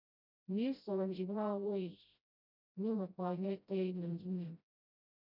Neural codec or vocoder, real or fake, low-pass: codec, 16 kHz, 0.5 kbps, FreqCodec, smaller model; fake; 5.4 kHz